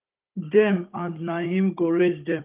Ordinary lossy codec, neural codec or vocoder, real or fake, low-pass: Opus, 32 kbps; codec, 16 kHz, 16 kbps, FunCodec, trained on Chinese and English, 50 frames a second; fake; 3.6 kHz